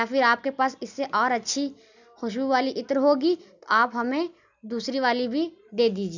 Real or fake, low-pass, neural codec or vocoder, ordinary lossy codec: real; 7.2 kHz; none; none